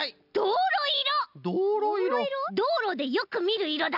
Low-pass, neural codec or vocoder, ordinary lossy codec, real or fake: 5.4 kHz; none; none; real